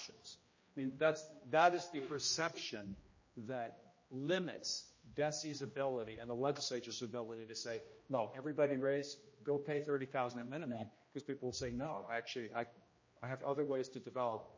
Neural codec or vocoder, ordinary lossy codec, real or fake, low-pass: codec, 16 kHz, 1 kbps, X-Codec, HuBERT features, trained on general audio; MP3, 32 kbps; fake; 7.2 kHz